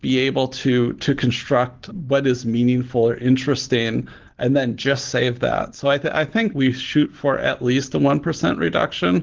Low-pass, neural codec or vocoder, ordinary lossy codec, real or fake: 7.2 kHz; none; Opus, 16 kbps; real